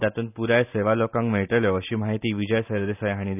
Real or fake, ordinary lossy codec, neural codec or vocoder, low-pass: real; none; none; 3.6 kHz